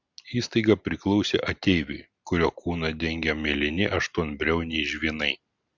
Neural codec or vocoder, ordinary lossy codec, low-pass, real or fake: none; Opus, 64 kbps; 7.2 kHz; real